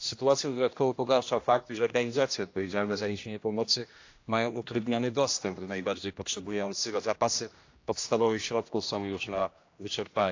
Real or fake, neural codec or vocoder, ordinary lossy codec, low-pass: fake; codec, 16 kHz, 1 kbps, X-Codec, HuBERT features, trained on general audio; AAC, 48 kbps; 7.2 kHz